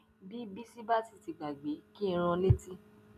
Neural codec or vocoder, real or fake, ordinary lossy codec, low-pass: none; real; none; 14.4 kHz